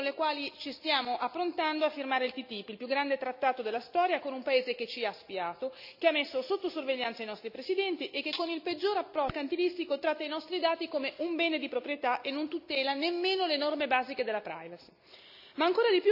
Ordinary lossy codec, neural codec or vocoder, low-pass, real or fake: none; none; 5.4 kHz; real